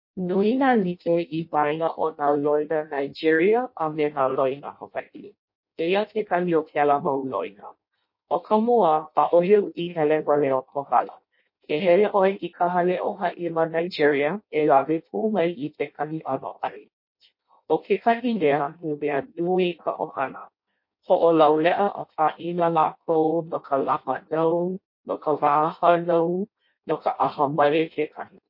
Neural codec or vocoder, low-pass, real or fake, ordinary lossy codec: codec, 16 kHz in and 24 kHz out, 0.6 kbps, FireRedTTS-2 codec; 5.4 kHz; fake; MP3, 32 kbps